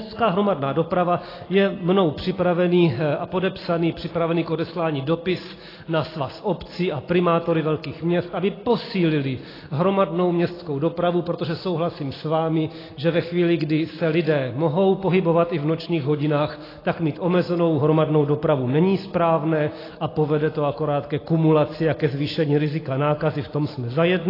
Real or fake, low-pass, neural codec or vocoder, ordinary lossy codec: real; 5.4 kHz; none; AAC, 24 kbps